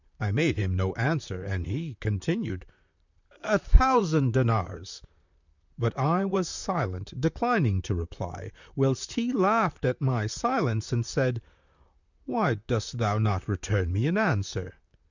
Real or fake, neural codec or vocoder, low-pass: fake; vocoder, 44.1 kHz, 128 mel bands, Pupu-Vocoder; 7.2 kHz